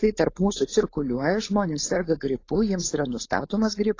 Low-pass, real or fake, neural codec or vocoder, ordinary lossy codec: 7.2 kHz; fake; codec, 16 kHz, 4.8 kbps, FACodec; AAC, 32 kbps